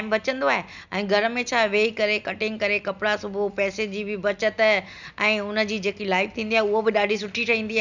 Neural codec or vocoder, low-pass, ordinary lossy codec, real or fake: none; 7.2 kHz; none; real